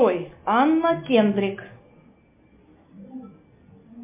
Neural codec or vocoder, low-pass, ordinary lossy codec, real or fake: none; 3.6 kHz; MP3, 32 kbps; real